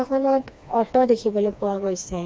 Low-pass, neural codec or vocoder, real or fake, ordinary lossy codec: none; codec, 16 kHz, 2 kbps, FreqCodec, smaller model; fake; none